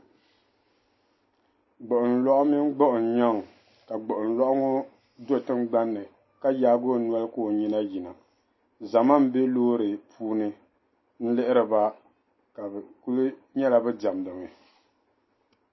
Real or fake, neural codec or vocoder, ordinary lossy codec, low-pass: real; none; MP3, 24 kbps; 7.2 kHz